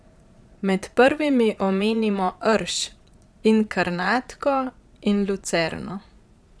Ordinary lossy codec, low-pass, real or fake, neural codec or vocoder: none; none; fake; vocoder, 22.05 kHz, 80 mel bands, Vocos